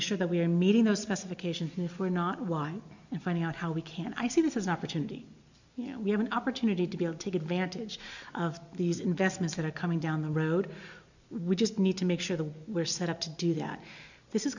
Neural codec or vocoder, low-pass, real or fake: none; 7.2 kHz; real